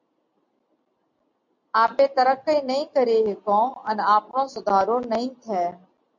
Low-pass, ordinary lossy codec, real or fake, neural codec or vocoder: 7.2 kHz; MP3, 48 kbps; real; none